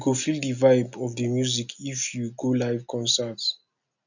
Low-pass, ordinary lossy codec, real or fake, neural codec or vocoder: 7.2 kHz; none; real; none